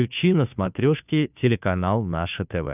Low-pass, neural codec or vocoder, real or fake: 3.6 kHz; codec, 16 kHz, 2 kbps, FunCodec, trained on Chinese and English, 25 frames a second; fake